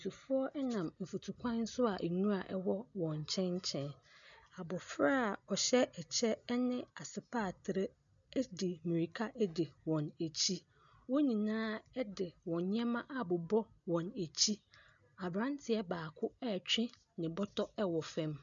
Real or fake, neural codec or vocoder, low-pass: real; none; 7.2 kHz